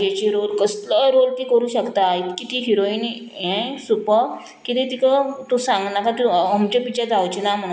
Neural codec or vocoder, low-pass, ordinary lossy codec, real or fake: none; none; none; real